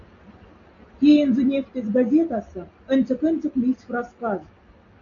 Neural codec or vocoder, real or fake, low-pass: none; real; 7.2 kHz